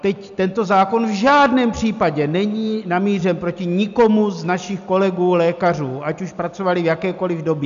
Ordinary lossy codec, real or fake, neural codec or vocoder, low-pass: MP3, 96 kbps; real; none; 7.2 kHz